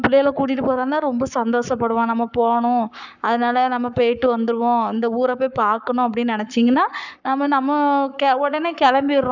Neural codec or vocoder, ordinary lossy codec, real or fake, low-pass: codec, 44.1 kHz, 7.8 kbps, Pupu-Codec; none; fake; 7.2 kHz